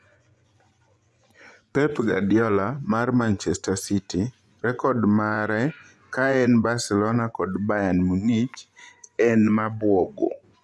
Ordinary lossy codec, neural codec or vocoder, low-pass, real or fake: none; vocoder, 24 kHz, 100 mel bands, Vocos; none; fake